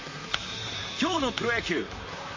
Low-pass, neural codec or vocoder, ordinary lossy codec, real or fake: 7.2 kHz; vocoder, 44.1 kHz, 128 mel bands, Pupu-Vocoder; MP3, 32 kbps; fake